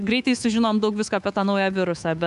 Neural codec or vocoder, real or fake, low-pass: codec, 24 kHz, 3.1 kbps, DualCodec; fake; 10.8 kHz